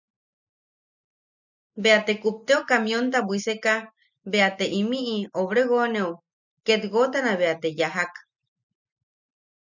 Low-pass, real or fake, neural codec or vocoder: 7.2 kHz; real; none